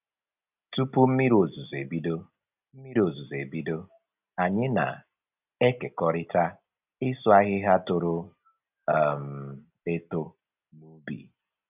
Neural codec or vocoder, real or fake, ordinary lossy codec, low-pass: none; real; none; 3.6 kHz